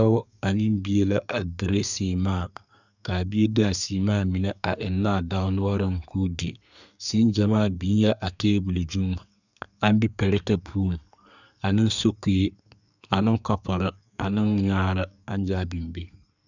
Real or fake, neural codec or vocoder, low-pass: fake; codec, 32 kHz, 1.9 kbps, SNAC; 7.2 kHz